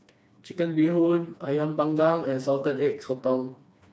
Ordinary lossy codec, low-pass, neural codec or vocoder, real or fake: none; none; codec, 16 kHz, 2 kbps, FreqCodec, smaller model; fake